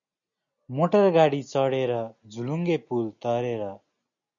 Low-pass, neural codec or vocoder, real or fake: 7.2 kHz; none; real